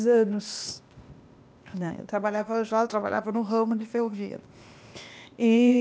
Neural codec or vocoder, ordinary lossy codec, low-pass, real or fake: codec, 16 kHz, 0.8 kbps, ZipCodec; none; none; fake